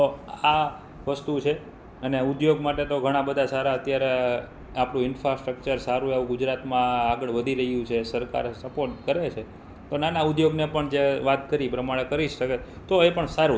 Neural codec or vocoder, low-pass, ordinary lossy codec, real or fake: none; none; none; real